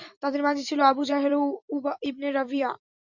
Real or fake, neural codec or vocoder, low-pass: fake; vocoder, 44.1 kHz, 128 mel bands every 256 samples, BigVGAN v2; 7.2 kHz